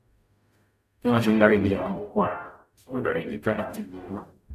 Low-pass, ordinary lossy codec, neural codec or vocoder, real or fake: 14.4 kHz; none; codec, 44.1 kHz, 0.9 kbps, DAC; fake